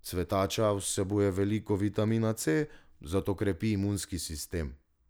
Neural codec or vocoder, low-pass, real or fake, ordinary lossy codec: none; none; real; none